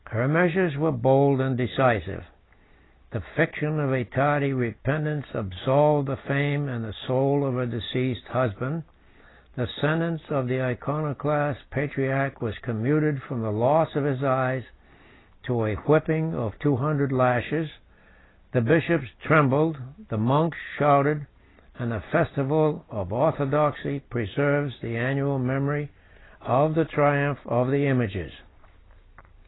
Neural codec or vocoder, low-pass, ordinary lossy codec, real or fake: none; 7.2 kHz; AAC, 16 kbps; real